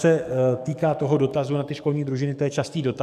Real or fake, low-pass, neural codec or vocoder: fake; 14.4 kHz; codec, 44.1 kHz, 7.8 kbps, DAC